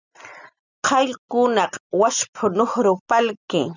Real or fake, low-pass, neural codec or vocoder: real; 7.2 kHz; none